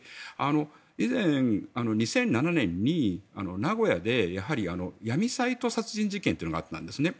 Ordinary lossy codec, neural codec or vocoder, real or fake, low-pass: none; none; real; none